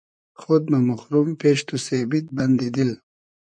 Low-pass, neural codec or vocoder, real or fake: 9.9 kHz; vocoder, 44.1 kHz, 128 mel bands, Pupu-Vocoder; fake